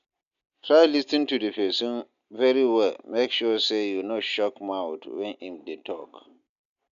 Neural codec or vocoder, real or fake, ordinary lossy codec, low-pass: none; real; none; 7.2 kHz